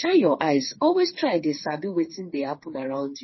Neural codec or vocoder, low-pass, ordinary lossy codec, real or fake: codec, 16 kHz, 4 kbps, FreqCodec, smaller model; 7.2 kHz; MP3, 24 kbps; fake